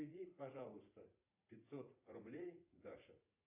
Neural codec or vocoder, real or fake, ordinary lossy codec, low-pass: vocoder, 44.1 kHz, 80 mel bands, Vocos; fake; AAC, 24 kbps; 3.6 kHz